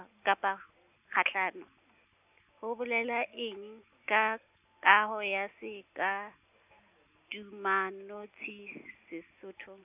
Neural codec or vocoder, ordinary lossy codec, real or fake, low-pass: none; none; real; 3.6 kHz